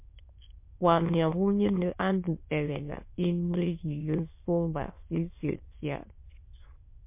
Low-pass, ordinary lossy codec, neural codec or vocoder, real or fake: 3.6 kHz; MP3, 24 kbps; autoencoder, 22.05 kHz, a latent of 192 numbers a frame, VITS, trained on many speakers; fake